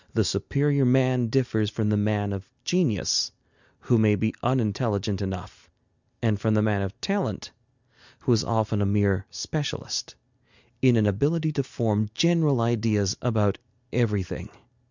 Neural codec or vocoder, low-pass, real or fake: none; 7.2 kHz; real